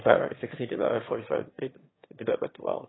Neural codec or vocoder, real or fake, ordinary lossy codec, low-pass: autoencoder, 22.05 kHz, a latent of 192 numbers a frame, VITS, trained on one speaker; fake; AAC, 16 kbps; 7.2 kHz